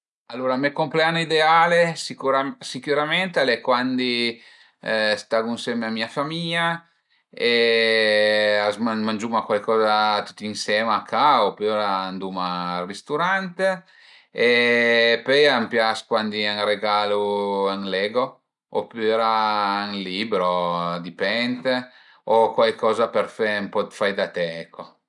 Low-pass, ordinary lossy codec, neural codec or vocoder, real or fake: 10.8 kHz; none; none; real